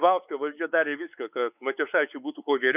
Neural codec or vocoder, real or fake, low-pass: codec, 16 kHz, 4 kbps, X-Codec, WavLM features, trained on Multilingual LibriSpeech; fake; 3.6 kHz